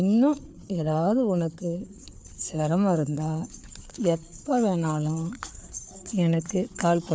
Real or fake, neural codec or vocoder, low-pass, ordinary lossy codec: fake; codec, 16 kHz, 4 kbps, FreqCodec, larger model; none; none